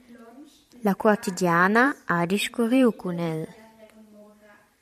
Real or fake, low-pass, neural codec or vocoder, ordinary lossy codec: fake; 14.4 kHz; vocoder, 44.1 kHz, 128 mel bands every 512 samples, BigVGAN v2; MP3, 96 kbps